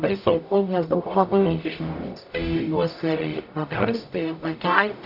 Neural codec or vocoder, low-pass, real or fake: codec, 44.1 kHz, 0.9 kbps, DAC; 5.4 kHz; fake